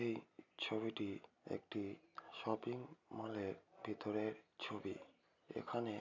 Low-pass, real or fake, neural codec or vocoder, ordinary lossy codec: 7.2 kHz; real; none; MP3, 64 kbps